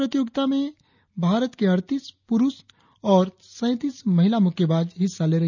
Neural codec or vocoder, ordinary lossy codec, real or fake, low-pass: none; none; real; 7.2 kHz